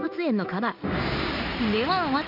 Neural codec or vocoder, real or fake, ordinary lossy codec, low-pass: codec, 16 kHz in and 24 kHz out, 1 kbps, XY-Tokenizer; fake; none; 5.4 kHz